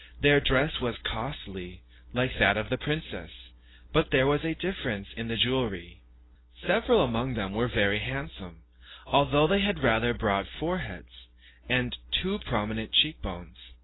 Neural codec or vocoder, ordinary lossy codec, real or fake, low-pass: none; AAC, 16 kbps; real; 7.2 kHz